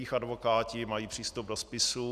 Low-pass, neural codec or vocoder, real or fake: 14.4 kHz; none; real